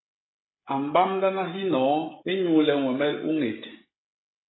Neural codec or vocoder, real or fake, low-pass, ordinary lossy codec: codec, 16 kHz, 16 kbps, FreqCodec, smaller model; fake; 7.2 kHz; AAC, 16 kbps